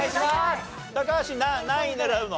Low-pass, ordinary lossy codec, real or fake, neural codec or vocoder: none; none; real; none